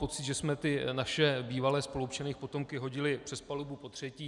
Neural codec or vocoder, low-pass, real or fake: none; 10.8 kHz; real